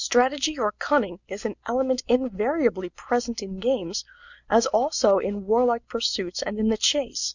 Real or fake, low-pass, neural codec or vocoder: real; 7.2 kHz; none